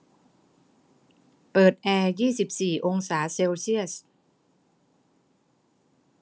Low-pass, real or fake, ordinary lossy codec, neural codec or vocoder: none; real; none; none